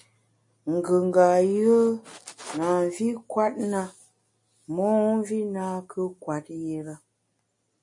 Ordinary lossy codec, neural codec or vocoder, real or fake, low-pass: MP3, 48 kbps; none; real; 10.8 kHz